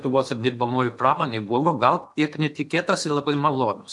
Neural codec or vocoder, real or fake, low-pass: codec, 16 kHz in and 24 kHz out, 0.8 kbps, FocalCodec, streaming, 65536 codes; fake; 10.8 kHz